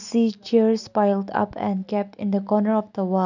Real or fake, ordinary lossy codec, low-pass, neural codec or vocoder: real; none; 7.2 kHz; none